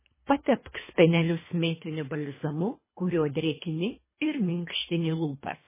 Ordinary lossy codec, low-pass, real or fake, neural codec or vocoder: MP3, 16 kbps; 3.6 kHz; fake; codec, 24 kHz, 3 kbps, HILCodec